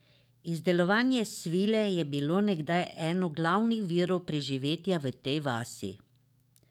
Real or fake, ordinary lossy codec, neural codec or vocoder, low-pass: fake; none; codec, 44.1 kHz, 7.8 kbps, DAC; 19.8 kHz